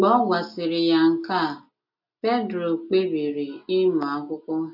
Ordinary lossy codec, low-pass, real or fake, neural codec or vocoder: none; 5.4 kHz; real; none